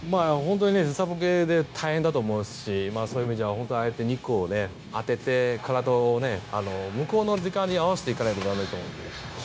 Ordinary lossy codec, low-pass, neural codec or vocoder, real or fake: none; none; codec, 16 kHz, 0.9 kbps, LongCat-Audio-Codec; fake